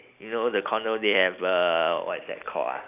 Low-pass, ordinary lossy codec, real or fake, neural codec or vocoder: 3.6 kHz; none; real; none